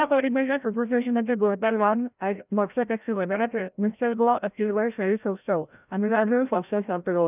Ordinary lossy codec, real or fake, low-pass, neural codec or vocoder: none; fake; 3.6 kHz; codec, 16 kHz, 0.5 kbps, FreqCodec, larger model